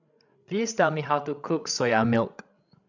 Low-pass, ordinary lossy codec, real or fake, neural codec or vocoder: 7.2 kHz; none; fake; codec, 16 kHz, 16 kbps, FreqCodec, larger model